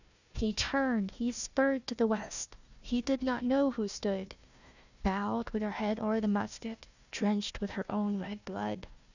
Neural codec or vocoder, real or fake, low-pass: codec, 16 kHz, 1 kbps, FunCodec, trained on Chinese and English, 50 frames a second; fake; 7.2 kHz